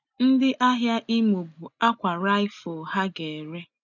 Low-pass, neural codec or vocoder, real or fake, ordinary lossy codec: 7.2 kHz; none; real; none